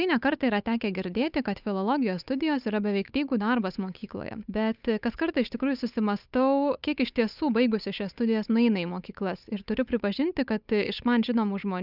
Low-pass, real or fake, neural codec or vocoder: 5.4 kHz; fake; codec, 16 kHz, 8 kbps, FunCodec, trained on Chinese and English, 25 frames a second